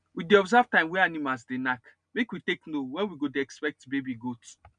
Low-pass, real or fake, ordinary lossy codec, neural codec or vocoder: 9.9 kHz; real; none; none